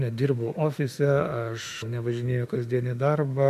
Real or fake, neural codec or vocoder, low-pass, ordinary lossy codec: fake; autoencoder, 48 kHz, 32 numbers a frame, DAC-VAE, trained on Japanese speech; 14.4 kHz; MP3, 64 kbps